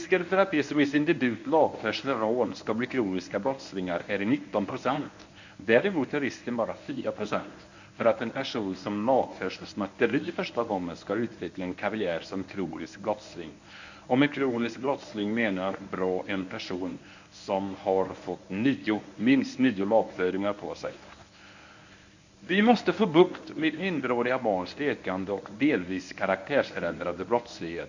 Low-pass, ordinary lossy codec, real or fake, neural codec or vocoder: 7.2 kHz; none; fake; codec, 24 kHz, 0.9 kbps, WavTokenizer, medium speech release version 1